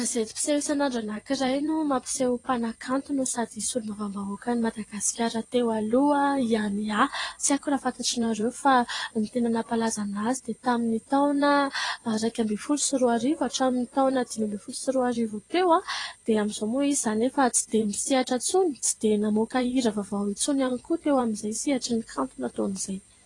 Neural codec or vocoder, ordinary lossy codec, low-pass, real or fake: vocoder, 24 kHz, 100 mel bands, Vocos; AAC, 32 kbps; 10.8 kHz; fake